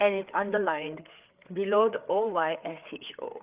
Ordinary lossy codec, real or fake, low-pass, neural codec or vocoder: Opus, 24 kbps; fake; 3.6 kHz; codec, 16 kHz, 4 kbps, FreqCodec, larger model